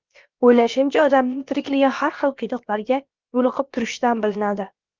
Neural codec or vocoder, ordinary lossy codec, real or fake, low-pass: codec, 16 kHz, about 1 kbps, DyCAST, with the encoder's durations; Opus, 24 kbps; fake; 7.2 kHz